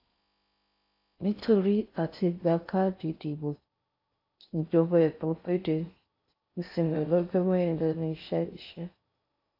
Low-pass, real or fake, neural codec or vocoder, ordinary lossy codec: 5.4 kHz; fake; codec, 16 kHz in and 24 kHz out, 0.6 kbps, FocalCodec, streaming, 4096 codes; AAC, 32 kbps